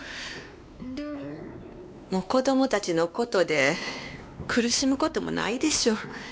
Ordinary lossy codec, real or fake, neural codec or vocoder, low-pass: none; fake; codec, 16 kHz, 2 kbps, X-Codec, WavLM features, trained on Multilingual LibriSpeech; none